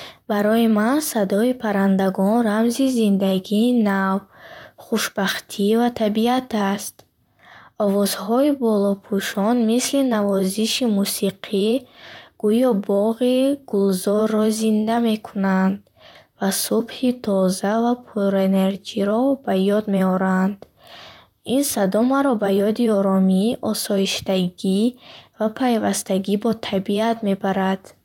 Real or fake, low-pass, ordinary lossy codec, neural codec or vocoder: fake; 19.8 kHz; none; vocoder, 44.1 kHz, 128 mel bands, Pupu-Vocoder